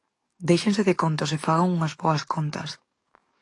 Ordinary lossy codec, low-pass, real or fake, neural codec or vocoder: AAC, 48 kbps; 10.8 kHz; fake; codec, 44.1 kHz, 7.8 kbps, DAC